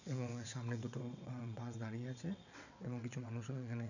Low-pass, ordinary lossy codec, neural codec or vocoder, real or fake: 7.2 kHz; none; vocoder, 22.05 kHz, 80 mel bands, WaveNeXt; fake